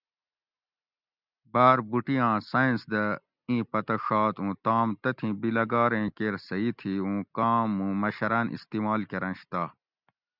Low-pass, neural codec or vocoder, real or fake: 5.4 kHz; none; real